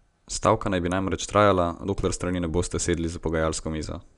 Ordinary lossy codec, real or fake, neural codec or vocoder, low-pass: none; real; none; 10.8 kHz